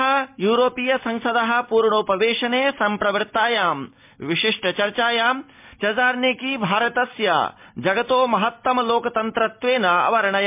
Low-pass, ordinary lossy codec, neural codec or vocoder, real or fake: 3.6 kHz; MP3, 32 kbps; none; real